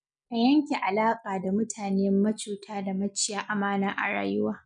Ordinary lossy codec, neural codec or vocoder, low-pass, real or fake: none; none; 9.9 kHz; real